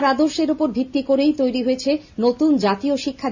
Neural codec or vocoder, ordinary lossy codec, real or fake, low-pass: none; Opus, 64 kbps; real; 7.2 kHz